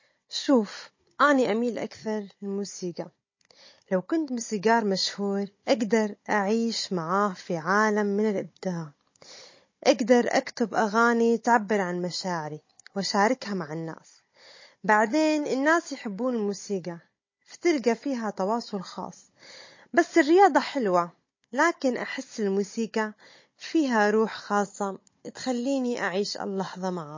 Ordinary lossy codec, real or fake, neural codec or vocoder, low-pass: MP3, 32 kbps; fake; codec, 16 kHz, 16 kbps, FunCodec, trained on Chinese and English, 50 frames a second; 7.2 kHz